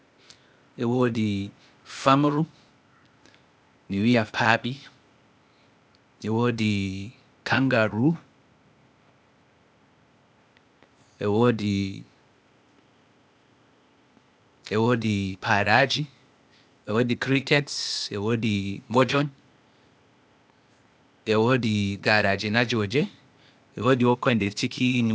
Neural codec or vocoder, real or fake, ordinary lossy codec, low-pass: codec, 16 kHz, 0.8 kbps, ZipCodec; fake; none; none